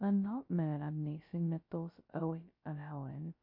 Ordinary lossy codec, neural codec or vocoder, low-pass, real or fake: MP3, 32 kbps; codec, 16 kHz, 0.2 kbps, FocalCodec; 5.4 kHz; fake